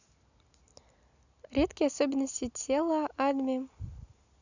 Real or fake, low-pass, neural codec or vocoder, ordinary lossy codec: real; 7.2 kHz; none; none